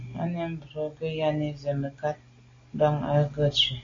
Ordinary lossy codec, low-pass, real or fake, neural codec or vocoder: AAC, 32 kbps; 7.2 kHz; real; none